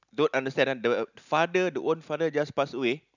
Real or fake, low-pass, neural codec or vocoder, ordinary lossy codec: real; 7.2 kHz; none; none